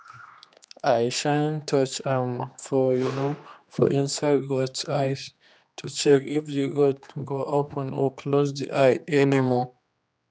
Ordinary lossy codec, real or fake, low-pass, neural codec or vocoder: none; fake; none; codec, 16 kHz, 2 kbps, X-Codec, HuBERT features, trained on general audio